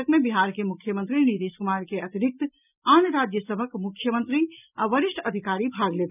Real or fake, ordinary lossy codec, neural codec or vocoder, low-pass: real; none; none; 3.6 kHz